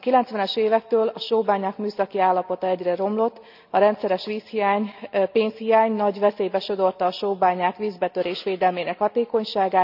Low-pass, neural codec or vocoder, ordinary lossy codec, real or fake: 5.4 kHz; none; none; real